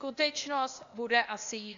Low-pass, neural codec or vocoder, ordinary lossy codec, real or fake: 7.2 kHz; codec, 16 kHz, 0.8 kbps, ZipCodec; AAC, 64 kbps; fake